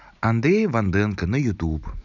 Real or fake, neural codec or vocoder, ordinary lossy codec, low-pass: real; none; none; 7.2 kHz